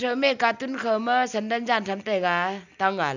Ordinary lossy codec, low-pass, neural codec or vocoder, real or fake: none; 7.2 kHz; none; real